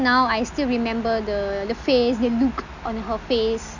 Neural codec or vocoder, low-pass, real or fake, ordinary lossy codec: none; 7.2 kHz; real; none